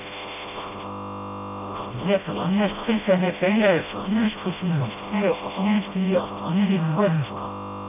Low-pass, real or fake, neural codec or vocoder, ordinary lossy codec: 3.6 kHz; fake; codec, 16 kHz, 0.5 kbps, FreqCodec, smaller model; none